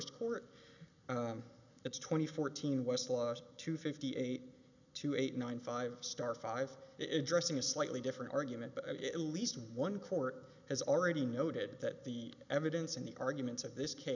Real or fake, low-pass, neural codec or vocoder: real; 7.2 kHz; none